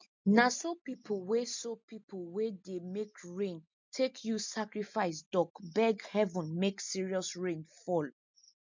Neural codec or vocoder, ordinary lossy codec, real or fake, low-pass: none; MP3, 64 kbps; real; 7.2 kHz